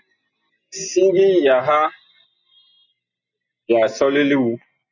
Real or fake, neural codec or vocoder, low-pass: real; none; 7.2 kHz